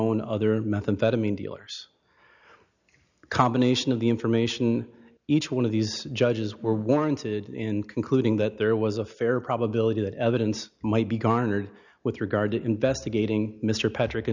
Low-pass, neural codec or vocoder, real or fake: 7.2 kHz; none; real